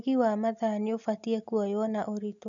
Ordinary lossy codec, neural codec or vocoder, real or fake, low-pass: none; none; real; 7.2 kHz